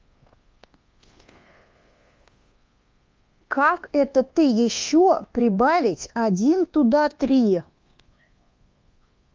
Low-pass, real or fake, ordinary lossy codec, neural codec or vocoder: 7.2 kHz; fake; Opus, 32 kbps; codec, 24 kHz, 1.2 kbps, DualCodec